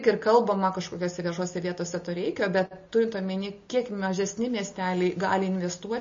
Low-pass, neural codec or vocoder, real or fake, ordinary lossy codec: 7.2 kHz; none; real; MP3, 32 kbps